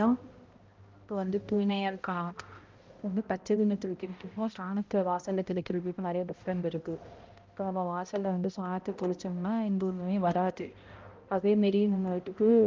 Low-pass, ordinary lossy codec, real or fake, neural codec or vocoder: 7.2 kHz; Opus, 32 kbps; fake; codec, 16 kHz, 0.5 kbps, X-Codec, HuBERT features, trained on balanced general audio